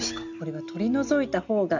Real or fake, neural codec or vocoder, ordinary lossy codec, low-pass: real; none; none; 7.2 kHz